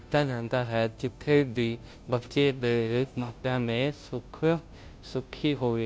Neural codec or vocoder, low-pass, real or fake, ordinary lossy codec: codec, 16 kHz, 0.5 kbps, FunCodec, trained on Chinese and English, 25 frames a second; none; fake; none